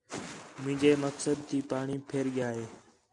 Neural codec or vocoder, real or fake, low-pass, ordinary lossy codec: none; real; 10.8 kHz; MP3, 96 kbps